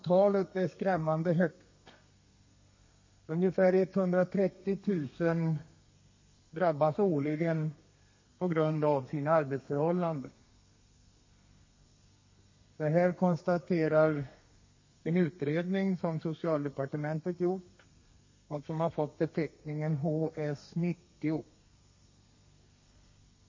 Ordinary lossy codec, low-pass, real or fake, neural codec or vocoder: MP3, 32 kbps; 7.2 kHz; fake; codec, 32 kHz, 1.9 kbps, SNAC